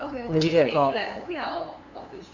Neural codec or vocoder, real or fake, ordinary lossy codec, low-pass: codec, 16 kHz, 2 kbps, FunCodec, trained on LibriTTS, 25 frames a second; fake; none; 7.2 kHz